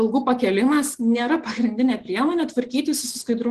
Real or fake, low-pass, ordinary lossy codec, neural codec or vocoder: real; 10.8 kHz; Opus, 16 kbps; none